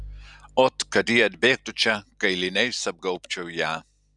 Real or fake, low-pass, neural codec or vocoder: real; 10.8 kHz; none